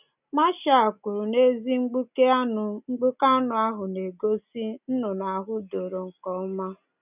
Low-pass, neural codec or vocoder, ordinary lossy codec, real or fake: 3.6 kHz; none; none; real